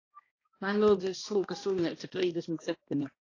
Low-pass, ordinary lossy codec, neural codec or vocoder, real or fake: 7.2 kHz; AAC, 32 kbps; codec, 16 kHz, 1 kbps, X-Codec, HuBERT features, trained on balanced general audio; fake